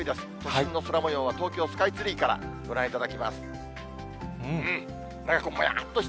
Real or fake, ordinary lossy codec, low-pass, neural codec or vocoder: real; none; none; none